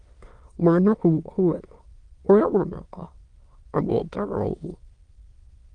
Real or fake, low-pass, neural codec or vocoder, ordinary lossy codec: fake; 9.9 kHz; autoencoder, 22.05 kHz, a latent of 192 numbers a frame, VITS, trained on many speakers; Opus, 24 kbps